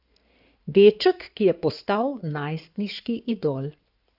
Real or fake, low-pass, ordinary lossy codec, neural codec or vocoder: fake; 5.4 kHz; none; codec, 16 kHz in and 24 kHz out, 2.2 kbps, FireRedTTS-2 codec